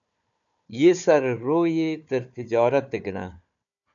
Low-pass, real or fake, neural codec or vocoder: 7.2 kHz; fake; codec, 16 kHz, 4 kbps, FunCodec, trained on Chinese and English, 50 frames a second